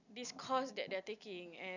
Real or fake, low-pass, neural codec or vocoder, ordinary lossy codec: real; 7.2 kHz; none; none